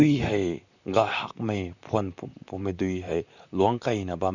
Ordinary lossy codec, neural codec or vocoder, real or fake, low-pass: none; vocoder, 44.1 kHz, 128 mel bands, Pupu-Vocoder; fake; 7.2 kHz